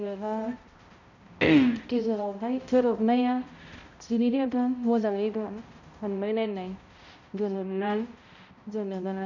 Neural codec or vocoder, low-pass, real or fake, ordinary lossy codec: codec, 16 kHz, 0.5 kbps, X-Codec, HuBERT features, trained on balanced general audio; 7.2 kHz; fake; none